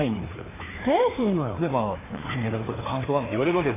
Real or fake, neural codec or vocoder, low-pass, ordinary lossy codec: fake; codec, 16 kHz, 2 kbps, FreqCodec, larger model; 3.6 kHz; AAC, 16 kbps